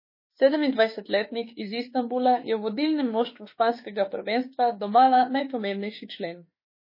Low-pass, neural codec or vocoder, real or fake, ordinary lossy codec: 5.4 kHz; autoencoder, 48 kHz, 32 numbers a frame, DAC-VAE, trained on Japanese speech; fake; MP3, 24 kbps